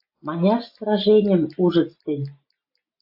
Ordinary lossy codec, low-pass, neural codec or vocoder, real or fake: AAC, 48 kbps; 5.4 kHz; none; real